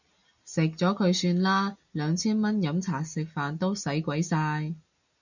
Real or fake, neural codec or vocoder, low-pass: real; none; 7.2 kHz